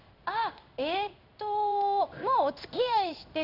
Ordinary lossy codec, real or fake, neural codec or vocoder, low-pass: none; fake; codec, 16 kHz in and 24 kHz out, 1 kbps, XY-Tokenizer; 5.4 kHz